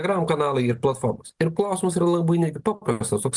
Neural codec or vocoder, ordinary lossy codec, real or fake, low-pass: none; Opus, 24 kbps; real; 10.8 kHz